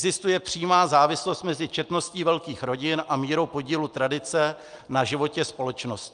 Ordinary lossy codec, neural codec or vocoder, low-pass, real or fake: Opus, 32 kbps; none; 9.9 kHz; real